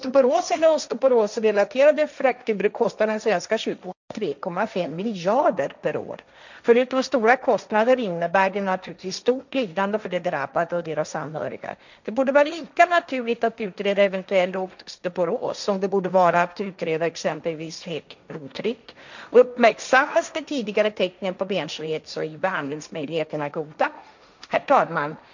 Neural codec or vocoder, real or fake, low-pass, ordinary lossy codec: codec, 16 kHz, 1.1 kbps, Voila-Tokenizer; fake; 7.2 kHz; none